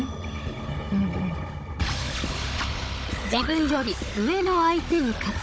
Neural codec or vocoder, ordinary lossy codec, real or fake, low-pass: codec, 16 kHz, 16 kbps, FunCodec, trained on Chinese and English, 50 frames a second; none; fake; none